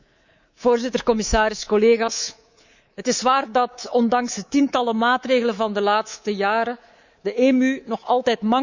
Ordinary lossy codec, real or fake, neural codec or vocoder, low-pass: Opus, 64 kbps; fake; codec, 24 kHz, 3.1 kbps, DualCodec; 7.2 kHz